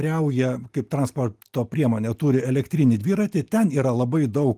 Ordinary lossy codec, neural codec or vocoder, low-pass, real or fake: Opus, 24 kbps; none; 14.4 kHz; real